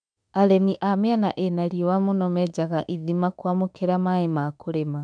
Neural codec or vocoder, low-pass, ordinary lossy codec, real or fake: autoencoder, 48 kHz, 32 numbers a frame, DAC-VAE, trained on Japanese speech; 9.9 kHz; none; fake